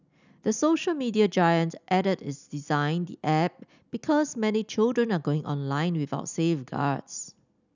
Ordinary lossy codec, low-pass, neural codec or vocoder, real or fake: none; 7.2 kHz; none; real